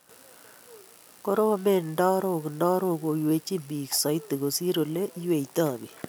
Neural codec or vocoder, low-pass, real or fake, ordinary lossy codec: none; none; real; none